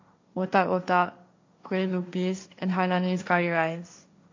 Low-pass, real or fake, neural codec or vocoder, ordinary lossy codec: 7.2 kHz; fake; codec, 16 kHz, 1.1 kbps, Voila-Tokenizer; MP3, 48 kbps